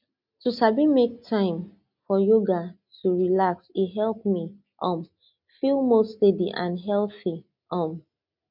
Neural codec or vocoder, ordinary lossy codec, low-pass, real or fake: none; none; 5.4 kHz; real